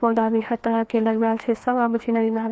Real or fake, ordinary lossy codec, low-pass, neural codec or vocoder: fake; none; none; codec, 16 kHz, 2 kbps, FreqCodec, larger model